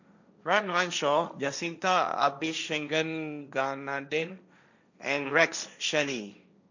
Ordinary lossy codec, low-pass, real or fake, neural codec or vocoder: none; 7.2 kHz; fake; codec, 16 kHz, 1.1 kbps, Voila-Tokenizer